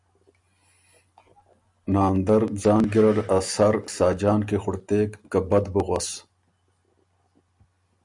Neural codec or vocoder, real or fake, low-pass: none; real; 10.8 kHz